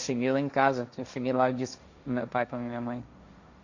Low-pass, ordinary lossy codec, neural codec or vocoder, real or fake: 7.2 kHz; Opus, 64 kbps; codec, 16 kHz, 1.1 kbps, Voila-Tokenizer; fake